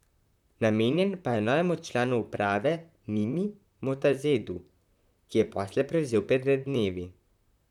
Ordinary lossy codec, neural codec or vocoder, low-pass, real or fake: none; vocoder, 44.1 kHz, 128 mel bands, Pupu-Vocoder; 19.8 kHz; fake